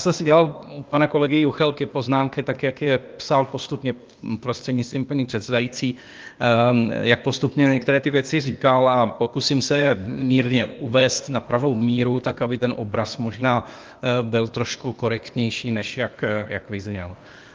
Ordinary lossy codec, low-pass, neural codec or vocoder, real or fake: Opus, 24 kbps; 7.2 kHz; codec, 16 kHz, 0.8 kbps, ZipCodec; fake